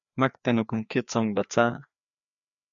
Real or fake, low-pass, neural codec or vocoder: fake; 7.2 kHz; codec, 16 kHz, 2 kbps, FreqCodec, larger model